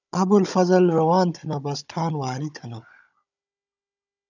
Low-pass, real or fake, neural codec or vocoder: 7.2 kHz; fake; codec, 16 kHz, 16 kbps, FunCodec, trained on Chinese and English, 50 frames a second